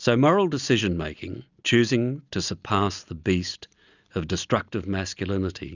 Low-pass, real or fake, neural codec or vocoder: 7.2 kHz; real; none